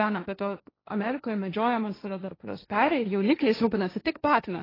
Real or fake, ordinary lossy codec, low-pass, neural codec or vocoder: fake; AAC, 24 kbps; 5.4 kHz; codec, 16 kHz, 1.1 kbps, Voila-Tokenizer